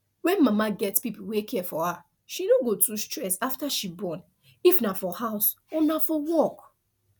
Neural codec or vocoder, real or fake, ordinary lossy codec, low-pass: none; real; none; none